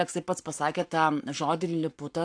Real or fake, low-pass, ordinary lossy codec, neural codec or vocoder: fake; 9.9 kHz; AAC, 64 kbps; codec, 44.1 kHz, 7.8 kbps, Pupu-Codec